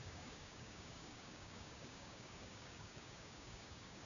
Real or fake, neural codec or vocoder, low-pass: fake; codec, 16 kHz, 6 kbps, DAC; 7.2 kHz